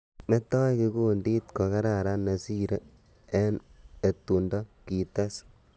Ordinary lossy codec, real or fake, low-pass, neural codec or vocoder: none; real; none; none